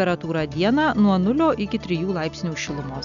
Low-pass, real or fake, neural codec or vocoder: 7.2 kHz; real; none